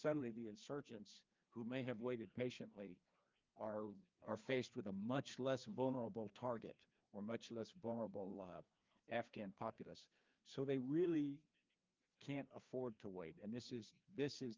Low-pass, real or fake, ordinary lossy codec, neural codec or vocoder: 7.2 kHz; fake; Opus, 24 kbps; codec, 16 kHz, 2 kbps, FreqCodec, larger model